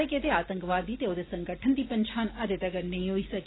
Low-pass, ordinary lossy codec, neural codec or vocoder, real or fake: 7.2 kHz; AAC, 16 kbps; none; real